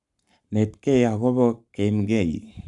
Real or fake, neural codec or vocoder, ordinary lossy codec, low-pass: fake; codec, 44.1 kHz, 7.8 kbps, Pupu-Codec; none; 10.8 kHz